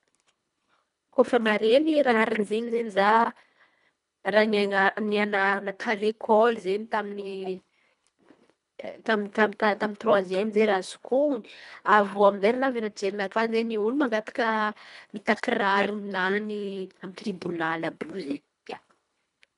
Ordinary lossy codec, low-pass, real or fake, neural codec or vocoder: none; 10.8 kHz; fake; codec, 24 kHz, 1.5 kbps, HILCodec